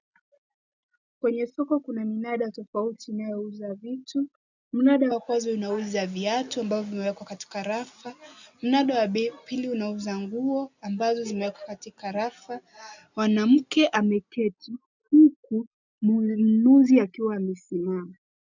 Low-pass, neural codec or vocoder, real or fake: 7.2 kHz; none; real